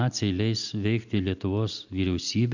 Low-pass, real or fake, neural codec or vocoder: 7.2 kHz; real; none